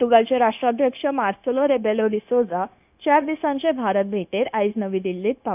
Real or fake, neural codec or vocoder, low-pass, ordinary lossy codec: fake; codec, 16 kHz, 0.9 kbps, LongCat-Audio-Codec; 3.6 kHz; none